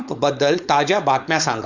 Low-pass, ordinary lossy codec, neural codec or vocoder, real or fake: 7.2 kHz; Opus, 64 kbps; codec, 16 kHz, 4.8 kbps, FACodec; fake